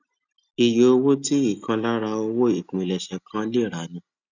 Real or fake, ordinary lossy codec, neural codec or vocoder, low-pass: real; none; none; 7.2 kHz